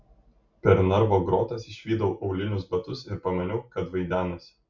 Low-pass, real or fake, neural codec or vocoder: 7.2 kHz; real; none